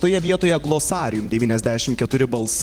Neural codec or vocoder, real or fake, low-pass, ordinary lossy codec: vocoder, 44.1 kHz, 128 mel bands, Pupu-Vocoder; fake; 19.8 kHz; Opus, 24 kbps